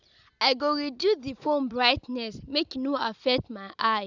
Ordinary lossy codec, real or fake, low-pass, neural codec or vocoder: Opus, 64 kbps; real; 7.2 kHz; none